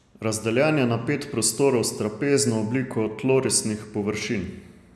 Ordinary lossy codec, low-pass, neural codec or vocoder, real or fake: none; none; none; real